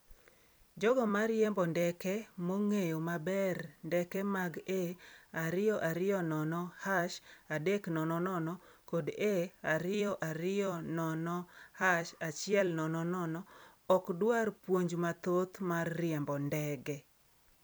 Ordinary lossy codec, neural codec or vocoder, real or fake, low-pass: none; vocoder, 44.1 kHz, 128 mel bands every 256 samples, BigVGAN v2; fake; none